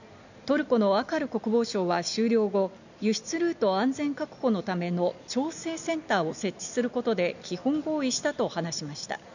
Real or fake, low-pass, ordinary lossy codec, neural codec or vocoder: real; 7.2 kHz; none; none